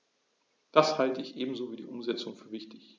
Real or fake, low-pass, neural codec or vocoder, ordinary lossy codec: real; none; none; none